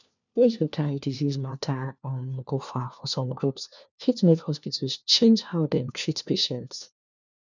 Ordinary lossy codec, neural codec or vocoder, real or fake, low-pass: none; codec, 16 kHz, 1 kbps, FunCodec, trained on LibriTTS, 50 frames a second; fake; 7.2 kHz